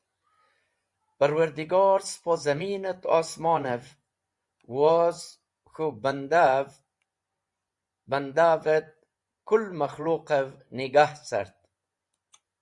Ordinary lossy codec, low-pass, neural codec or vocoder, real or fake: Opus, 64 kbps; 10.8 kHz; vocoder, 24 kHz, 100 mel bands, Vocos; fake